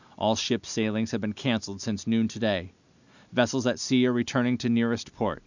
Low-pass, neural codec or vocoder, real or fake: 7.2 kHz; none; real